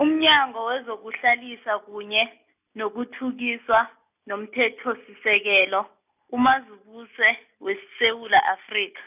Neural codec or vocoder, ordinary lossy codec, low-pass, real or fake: none; none; 3.6 kHz; real